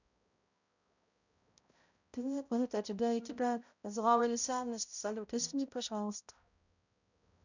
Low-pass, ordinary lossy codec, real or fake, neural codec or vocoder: 7.2 kHz; none; fake; codec, 16 kHz, 0.5 kbps, X-Codec, HuBERT features, trained on balanced general audio